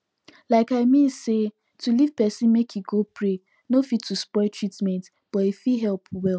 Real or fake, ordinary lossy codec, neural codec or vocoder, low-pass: real; none; none; none